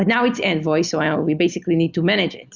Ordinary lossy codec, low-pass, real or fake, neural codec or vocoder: Opus, 64 kbps; 7.2 kHz; real; none